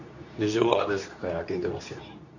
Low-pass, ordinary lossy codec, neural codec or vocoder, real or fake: 7.2 kHz; none; codec, 24 kHz, 0.9 kbps, WavTokenizer, medium speech release version 2; fake